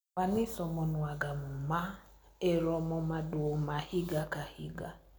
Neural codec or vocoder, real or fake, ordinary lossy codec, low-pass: none; real; none; none